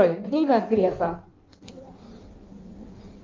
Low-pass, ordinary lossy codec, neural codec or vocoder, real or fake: 7.2 kHz; Opus, 24 kbps; codec, 16 kHz in and 24 kHz out, 1.1 kbps, FireRedTTS-2 codec; fake